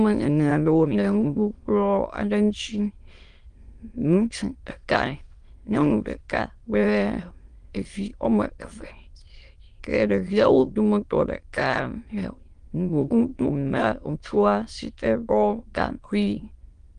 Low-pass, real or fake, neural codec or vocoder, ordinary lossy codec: 9.9 kHz; fake; autoencoder, 22.05 kHz, a latent of 192 numbers a frame, VITS, trained on many speakers; Opus, 24 kbps